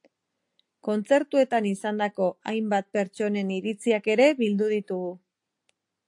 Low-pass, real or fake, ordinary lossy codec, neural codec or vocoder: 10.8 kHz; real; AAC, 64 kbps; none